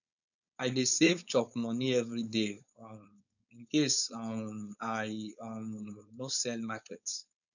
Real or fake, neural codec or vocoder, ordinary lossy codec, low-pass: fake; codec, 16 kHz, 4.8 kbps, FACodec; none; 7.2 kHz